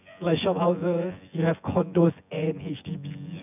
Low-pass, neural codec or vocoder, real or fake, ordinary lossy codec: 3.6 kHz; vocoder, 24 kHz, 100 mel bands, Vocos; fake; none